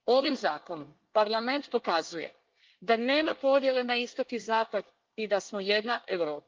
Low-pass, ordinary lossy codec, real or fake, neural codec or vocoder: 7.2 kHz; Opus, 32 kbps; fake; codec, 24 kHz, 1 kbps, SNAC